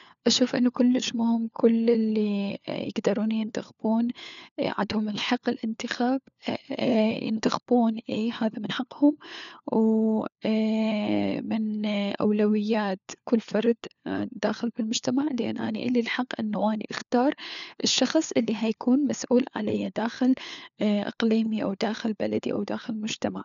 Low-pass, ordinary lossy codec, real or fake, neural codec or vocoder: 7.2 kHz; none; fake; codec, 16 kHz, 4 kbps, FunCodec, trained on LibriTTS, 50 frames a second